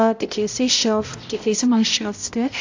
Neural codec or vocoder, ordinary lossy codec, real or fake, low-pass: codec, 16 kHz, 0.5 kbps, X-Codec, HuBERT features, trained on balanced general audio; AAC, 48 kbps; fake; 7.2 kHz